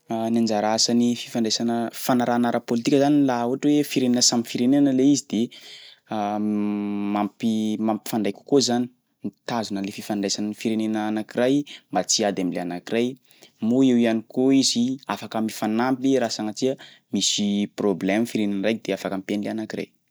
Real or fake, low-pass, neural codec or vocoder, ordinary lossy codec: real; none; none; none